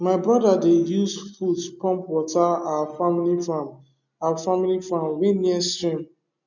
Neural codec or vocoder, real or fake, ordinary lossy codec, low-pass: none; real; none; 7.2 kHz